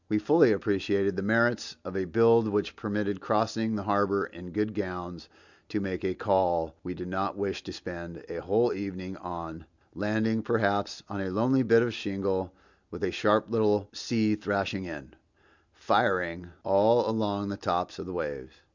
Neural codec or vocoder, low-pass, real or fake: none; 7.2 kHz; real